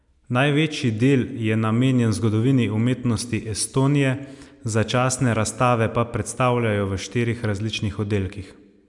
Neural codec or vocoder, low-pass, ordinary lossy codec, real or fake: none; 10.8 kHz; none; real